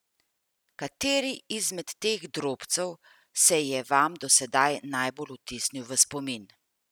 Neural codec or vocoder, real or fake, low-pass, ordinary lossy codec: none; real; none; none